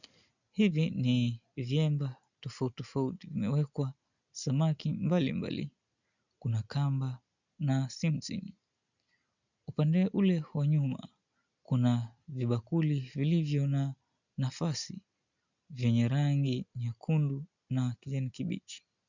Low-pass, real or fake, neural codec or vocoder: 7.2 kHz; real; none